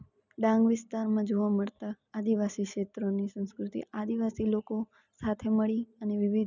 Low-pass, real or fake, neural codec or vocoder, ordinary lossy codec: 7.2 kHz; real; none; none